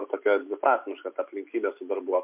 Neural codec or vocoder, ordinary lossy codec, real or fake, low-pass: none; MP3, 32 kbps; real; 3.6 kHz